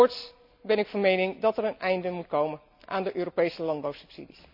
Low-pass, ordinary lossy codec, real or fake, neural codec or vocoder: 5.4 kHz; none; real; none